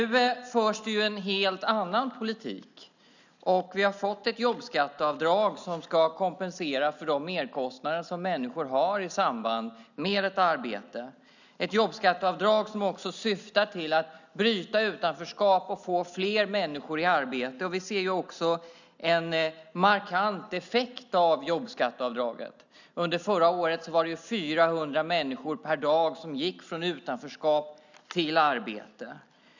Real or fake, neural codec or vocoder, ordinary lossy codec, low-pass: real; none; none; 7.2 kHz